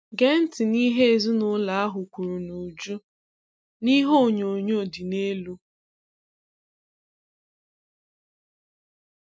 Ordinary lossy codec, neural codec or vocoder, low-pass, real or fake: none; none; none; real